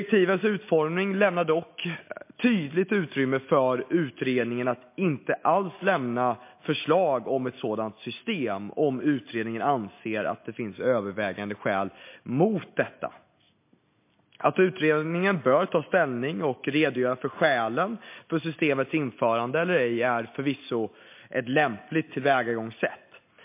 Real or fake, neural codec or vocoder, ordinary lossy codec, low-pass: real; none; MP3, 24 kbps; 3.6 kHz